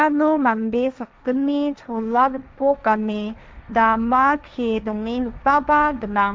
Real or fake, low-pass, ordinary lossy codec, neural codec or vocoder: fake; none; none; codec, 16 kHz, 1.1 kbps, Voila-Tokenizer